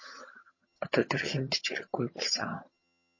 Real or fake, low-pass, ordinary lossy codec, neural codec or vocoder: fake; 7.2 kHz; MP3, 32 kbps; vocoder, 22.05 kHz, 80 mel bands, HiFi-GAN